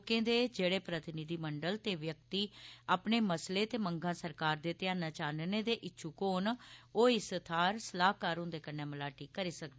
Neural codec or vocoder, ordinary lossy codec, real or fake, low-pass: none; none; real; none